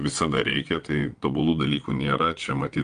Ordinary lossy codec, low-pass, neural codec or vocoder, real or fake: Opus, 32 kbps; 9.9 kHz; vocoder, 22.05 kHz, 80 mel bands, WaveNeXt; fake